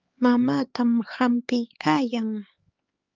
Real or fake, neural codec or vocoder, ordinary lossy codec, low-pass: fake; codec, 16 kHz, 4 kbps, X-Codec, HuBERT features, trained on LibriSpeech; Opus, 24 kbps; 7.2 kHz